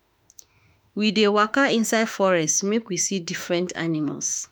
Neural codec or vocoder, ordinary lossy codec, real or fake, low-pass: autoencoder, 48 kHz, 32 numbers a frame, DAC-VAE, trained on Japanese speech; none; fake; none